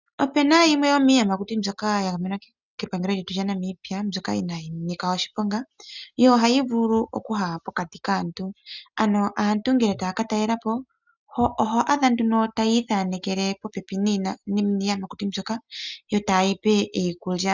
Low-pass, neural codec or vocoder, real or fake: 7.2 kHz; none; real